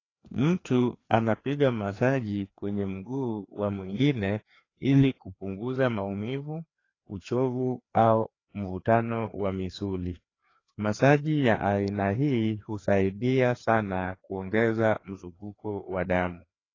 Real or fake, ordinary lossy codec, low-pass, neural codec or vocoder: fake; AAC, 32 kbps; 7.2 kHz; codec, 16 kHz, 2 kbps, FreqCodec, larger model